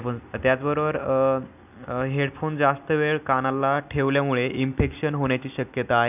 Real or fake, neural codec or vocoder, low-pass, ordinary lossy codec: real; none; 3.6 kHz; none